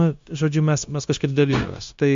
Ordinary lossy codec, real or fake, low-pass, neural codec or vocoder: MP3, 48 kbps; fake; 7.2 kHz; codec, 16 kHz, 0.9 kbps, LongCat-Audio-Codec